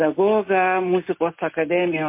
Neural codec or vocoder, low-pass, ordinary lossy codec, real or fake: none; 3.6 kHz; MP3, 24 kbps; real